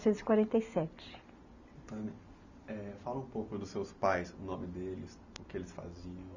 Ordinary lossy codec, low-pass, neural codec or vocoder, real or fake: none; 7.2 kHz; none; real